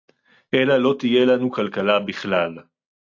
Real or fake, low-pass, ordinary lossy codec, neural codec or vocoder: real; 7.2 kHz; AAC, 48 kbps; none